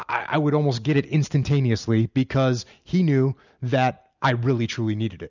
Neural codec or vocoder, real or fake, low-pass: none; real; 7.2 kHz